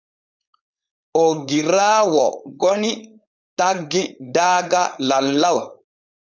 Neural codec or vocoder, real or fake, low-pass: codec, 16 kHz, 4.8 kbps, FACodec; fake; 7.2 kHz